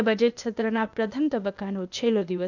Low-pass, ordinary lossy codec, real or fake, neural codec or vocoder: 7.2 kHz; none; fake; codec, 16 kHz, 0.8 kbps, ZipCodec